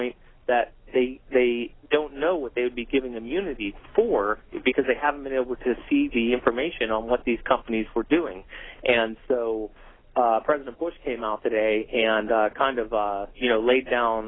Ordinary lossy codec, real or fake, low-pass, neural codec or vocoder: AAC, 16 kbps; real; 7.2 kHz; none